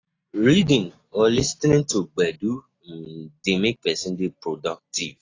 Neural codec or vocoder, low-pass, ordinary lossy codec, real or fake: none; 7.2 kHz; AAC, 32 kbps; real